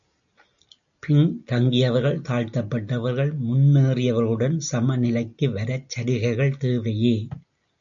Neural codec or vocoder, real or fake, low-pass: none; real; 7.2 kHz